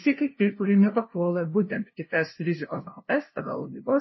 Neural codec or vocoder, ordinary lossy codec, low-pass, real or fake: codec, 16 kHz, 0.5 kbps, FunCodec, trained on LibriTTS, 25 frames a second; MP3, 24 kbps; 7.2 kHz; fake